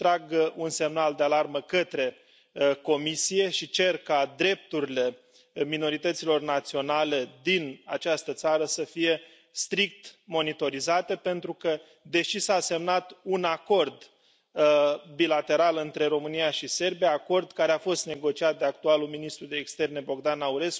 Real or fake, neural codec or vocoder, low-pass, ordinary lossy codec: real; none; none; none